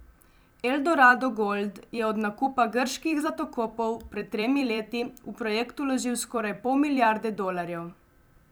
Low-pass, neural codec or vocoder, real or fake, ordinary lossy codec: none; vocoder, 44.1 kHz, 128 mel bands every 256 samples, BigVGAN v2; fake; none